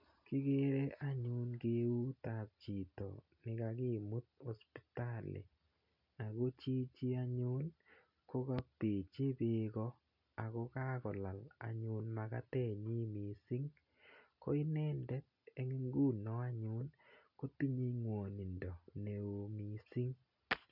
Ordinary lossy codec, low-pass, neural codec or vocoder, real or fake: none; 5.4 kHz; none; real